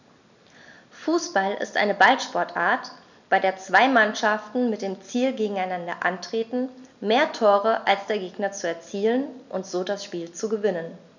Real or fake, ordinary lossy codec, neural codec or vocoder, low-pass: real; none; none; 7.2 kHz